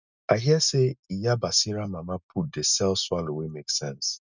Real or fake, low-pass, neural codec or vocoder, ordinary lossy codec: real; 7.2 kHz; none; none